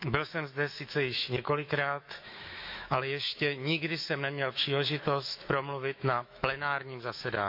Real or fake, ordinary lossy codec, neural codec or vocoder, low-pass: fake; MP3, 48 kbps; autoencoder, 48 kHz, 128 numbers a frame, DAC-VAE, trained on Japanese speech; 5.4 kHz